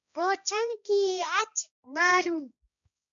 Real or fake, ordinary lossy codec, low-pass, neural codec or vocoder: fake; MP3, 96 kbps; 7.2 kHz; codec, 16 kHz, 1 kbps, X-Codec, HuBERT features, trained on balanced general audio